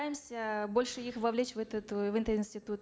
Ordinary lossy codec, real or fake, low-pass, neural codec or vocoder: none; real; none; none